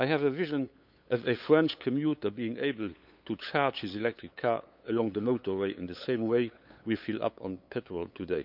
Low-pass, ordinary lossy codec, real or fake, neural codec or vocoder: 5.4 kHz; none; fake; codec, 16 kHz, 8 kbps, FunCodec, trained on LibriTTS, 25 frames a second